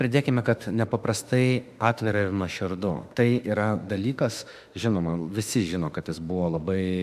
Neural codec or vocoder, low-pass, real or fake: autoencoder, 48 kHz, 32 numbers a frame, DAC-VAE, trained on Japanese speech; 14.4 kHz; fake